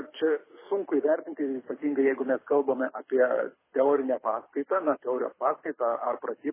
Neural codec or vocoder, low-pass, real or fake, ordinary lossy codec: codec, 24 kHz, 6 kbps, HILCodec; 3.6 kHz; fake; MP3, 16 kbps